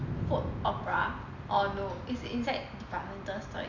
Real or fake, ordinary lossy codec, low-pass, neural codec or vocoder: real; none; 7.2 kHz; none